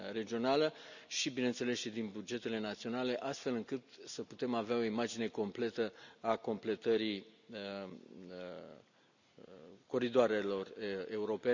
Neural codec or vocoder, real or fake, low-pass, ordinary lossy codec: none; real; 7.2 kHz; none